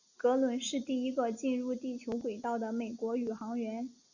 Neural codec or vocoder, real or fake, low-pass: none; real; 7.2 kHz